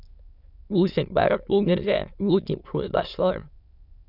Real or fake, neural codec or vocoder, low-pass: fake; autoencoder, 22.05 kHz, a latent of 192 numbers a frame, VITS, trained on many speakers; 5.4 kHz